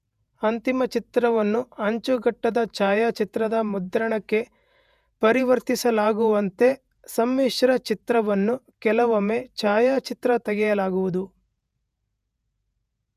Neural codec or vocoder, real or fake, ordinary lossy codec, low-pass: vocoder, 48 kHz, 128 mel bands, Vocos; fake; none; 14.4 kHz